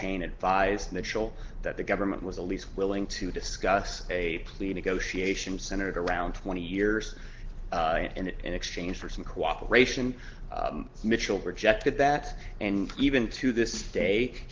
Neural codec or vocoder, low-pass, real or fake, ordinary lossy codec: none; 7.2 kHz; real; Opus, 16 kbps